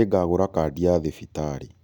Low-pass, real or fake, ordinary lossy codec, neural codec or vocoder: 19.8 kHz; real; none; none